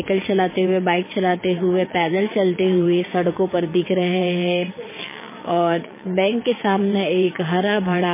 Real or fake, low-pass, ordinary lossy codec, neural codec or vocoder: fake; 3.6 kHz; MP3, 16 kbps; vocoder, 22.05 kHz, 80 mel bands, WaveNeXt